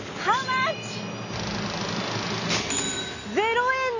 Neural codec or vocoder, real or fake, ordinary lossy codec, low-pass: none; real; none; 7.2 kHz